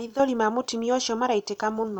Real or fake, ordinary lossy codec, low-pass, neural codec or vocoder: fake; none; 19.8 kHz; vocoder, 44.1 kHz, 128 mel bands every 256 samples, BigVGAN v2